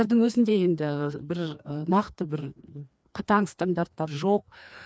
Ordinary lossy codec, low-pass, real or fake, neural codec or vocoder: none; none; fake; codec, 16 kHz, 2 kbps, FreqCodec, larger model